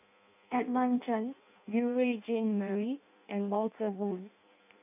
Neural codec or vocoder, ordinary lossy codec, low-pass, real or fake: codec, 16 kHz in and 24 kHz out, 0.6 kbps, FireRedTTS-2 codec; none; 3.6 kHz; fake